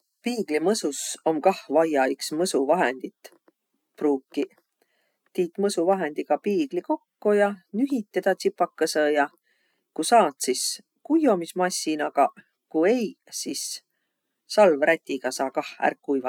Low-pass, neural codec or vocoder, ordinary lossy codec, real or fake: 19.8 kHz; none; none; real